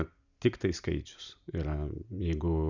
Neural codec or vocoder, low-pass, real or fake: none; 7.2 kHz; real